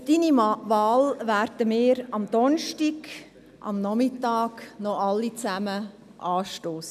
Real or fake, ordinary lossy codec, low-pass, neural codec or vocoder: real; none; 14.4 kHz; none